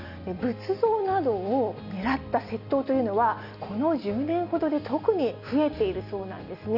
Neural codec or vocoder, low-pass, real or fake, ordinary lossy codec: none; 5.4 kHz; real; none